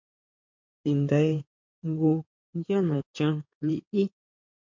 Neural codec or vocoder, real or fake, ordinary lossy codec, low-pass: codec, 16 kHz in and 24 kHz out, 2.2 kbps, FireRedTTS-2 codec; fake; MP3, 48 kbps; 7.2 kHz